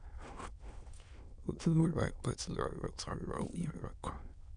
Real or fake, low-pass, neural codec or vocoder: fake; 9.9 kHz; autoencoder, 22.05 kHz, a latent of 192 numbers a frame, VITS, trained on many speakers